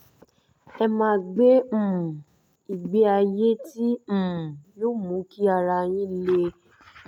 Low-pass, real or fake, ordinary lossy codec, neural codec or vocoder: 19.8 kHz; real; none; none